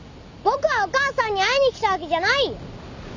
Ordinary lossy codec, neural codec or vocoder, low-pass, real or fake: none; none; 7.2 kHz; real